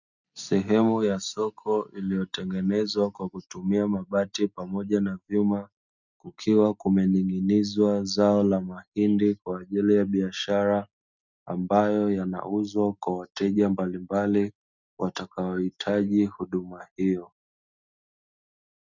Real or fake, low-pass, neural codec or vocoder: real; 7.2 kHz; none